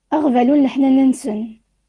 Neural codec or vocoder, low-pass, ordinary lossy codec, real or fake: none; 10.8 kHz; Opus, 24 kbps; real